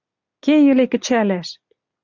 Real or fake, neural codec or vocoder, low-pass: real; none; 7.2 kHz